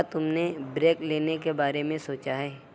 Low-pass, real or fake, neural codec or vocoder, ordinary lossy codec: none; real; none; none